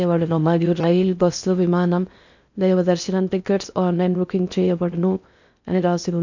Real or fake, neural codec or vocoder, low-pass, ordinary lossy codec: fake; codec, 16 kHz in and 24 kHz out, 0.6 kbps, FocalCodec, streaming, 4096 codes; 7.2 kHz; none